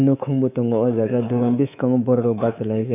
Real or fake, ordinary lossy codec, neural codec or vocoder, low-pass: fake; none; codec, 24 kHz, 3.1 kbps, DualCodec; 3.6 kHz